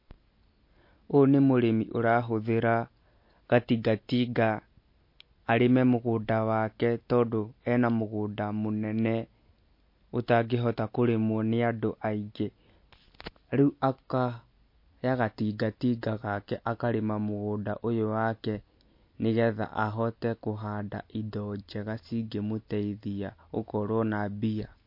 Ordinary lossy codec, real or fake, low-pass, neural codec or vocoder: MP3, 32 kbps; real; 5.4 kHz; none